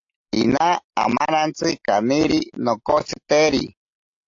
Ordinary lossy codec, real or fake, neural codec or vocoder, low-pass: AAC, 48 kbps; real; none; 7.2 kHz